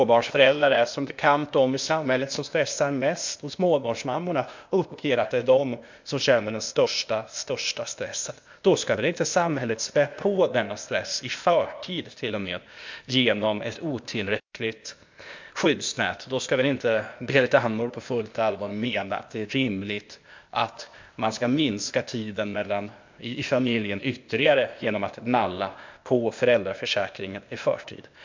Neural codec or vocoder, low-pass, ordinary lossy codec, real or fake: codec, 16 kHz, 0.8 kbps, ZipCodec; 7.2 kHz; MP3, 64 kbps; fake